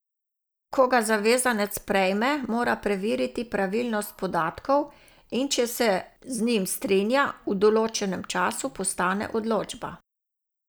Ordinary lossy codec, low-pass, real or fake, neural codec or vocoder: none; none; real; none